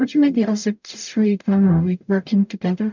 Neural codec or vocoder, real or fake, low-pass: codec, 44.1 kHz, 0.9 kbps, DAC; fake; 7.2 kHz